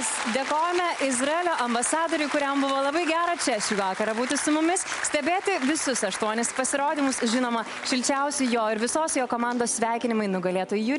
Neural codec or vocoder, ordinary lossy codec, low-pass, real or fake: none; MP3, 64 kbps; 10.8 kHz; real